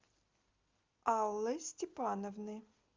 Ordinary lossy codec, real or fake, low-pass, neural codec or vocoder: Opus, 32 kbps; real; 7.2 kHz; none